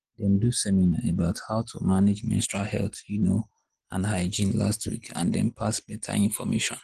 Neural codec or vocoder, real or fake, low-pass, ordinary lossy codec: none; real; 9.9 kHz; Opus, 16 kbps